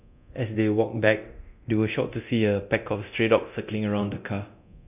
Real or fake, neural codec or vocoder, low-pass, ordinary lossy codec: fake; codec, 24 kHz, 0.9 kbps, DualCodec; 3.6 kHz; none